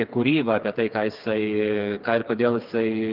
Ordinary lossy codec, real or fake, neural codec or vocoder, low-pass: Opus, 32 kbps; fake; codec, 16 kHz, 4 kbps, FreqCodec, smaller model; 5.4 kHz